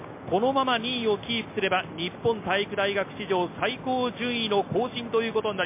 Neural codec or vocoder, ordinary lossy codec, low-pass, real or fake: none; MP3, 24 kbps; 3.6 kHz; real